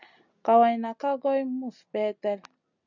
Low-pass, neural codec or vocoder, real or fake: 7.2 kHz; none; real